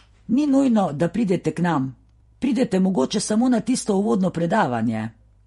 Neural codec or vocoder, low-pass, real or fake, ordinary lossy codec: vocoder, 48 kHz, 128 mel bands, Vocos; 19.8 kHz; fake; MP3, 48 kbps